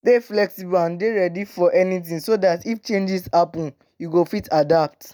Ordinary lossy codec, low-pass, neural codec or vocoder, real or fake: none; none; none; real